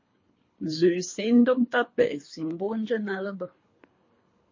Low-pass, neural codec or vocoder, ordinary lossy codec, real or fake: 7.2 kHz; codec, 24 kHz, 3 kbps, HILCodec; MP3, 32 kbps; fake